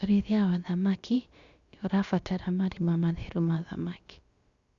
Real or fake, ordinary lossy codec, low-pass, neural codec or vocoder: fake; Opus, 64 kbps; 7.2 kHz; codec, 16 kHz, about 1 kbps, DyCAST, with the encoder's durations